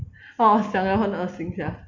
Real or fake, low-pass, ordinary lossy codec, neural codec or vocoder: real; 7.2 kHz; Opus, 64 kbps; none